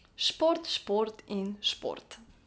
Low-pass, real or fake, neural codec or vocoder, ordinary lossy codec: none; real; none; none